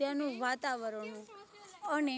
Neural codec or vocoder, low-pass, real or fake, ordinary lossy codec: none; none; real; none